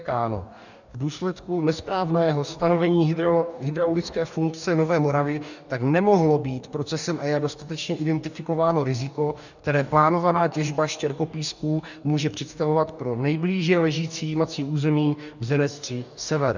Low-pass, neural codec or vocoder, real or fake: 7.2 kHz; codec, 44.1 kHz, 2.6 kbps, DAC; fake